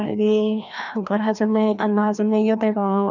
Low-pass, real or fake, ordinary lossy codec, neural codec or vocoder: 7.2 kHz; fake; none; codec, 16 kHz, 1 kbps, FreqCodec, larger model